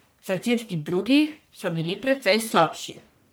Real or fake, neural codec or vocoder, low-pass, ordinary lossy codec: fake; codec, 44.1 kHz, 1.7 kbps, Pupu-Codec; none; none